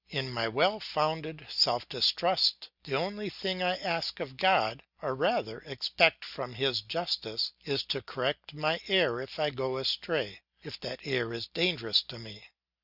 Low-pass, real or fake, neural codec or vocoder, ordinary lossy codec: 5.4 kHz; real; none; Opus, 64 kbps